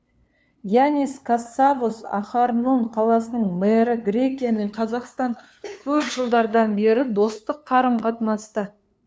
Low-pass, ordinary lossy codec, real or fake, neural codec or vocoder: none; none; fake; codec, 16 kHz, 2 kbps, FunCodec, trained on LibriTTS, 25 frames a second